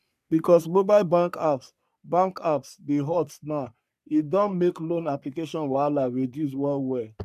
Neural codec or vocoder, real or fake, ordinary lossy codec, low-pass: codec, 44.1 kHz, 3.4 kbps, Pupu-Codec; fake; none; 14.4 kHz